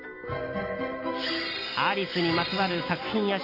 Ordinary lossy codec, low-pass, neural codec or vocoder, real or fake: MP3, 32 kbps; 5.4 kHz; none; real